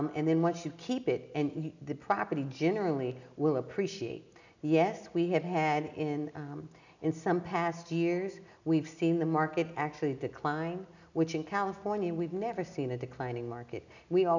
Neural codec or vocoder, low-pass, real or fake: none; 7.2 kHz; real